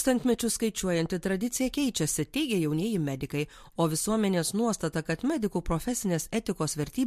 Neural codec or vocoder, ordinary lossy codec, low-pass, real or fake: vocoder, 44.1 kHz, 128 mel bands every 256 samples, BigVGAN v2; MP3, 64 kbps; 14.4 kHz; fake